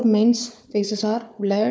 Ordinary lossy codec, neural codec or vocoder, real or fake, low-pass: none; codec, 16 kHz, 4 kbps, FunCodec, trained on Chinese and English, 50 frames a second; fake; none